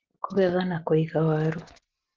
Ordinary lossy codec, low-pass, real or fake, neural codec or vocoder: Opus, 16 kbps; 7.2 kHz; real; none